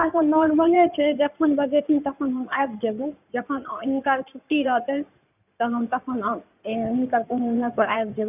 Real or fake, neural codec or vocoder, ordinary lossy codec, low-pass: fake; codec, 16 kHz in and 24 kHz out, 2.2 kbps, FireRedTTS-2 codec; none; 3.6 kHz